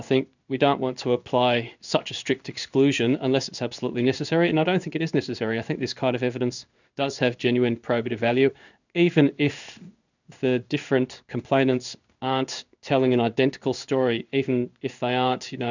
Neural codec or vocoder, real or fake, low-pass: codec, 16 kHz in and 24 kHz out, 1 kbps, XY-Tokenizer; fake; 7.2 kHz